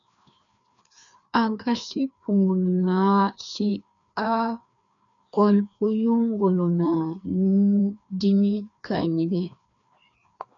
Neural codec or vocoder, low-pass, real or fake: codec, 16 kHz, 2 kbps, FreqCodec, larger model; 7.2 kHz; fake